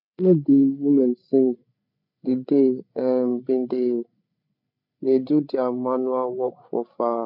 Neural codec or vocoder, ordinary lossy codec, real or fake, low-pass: codec, 16 kHz, 8 kbps, FreqCodec, larger model; none; fake; 5.4 kHz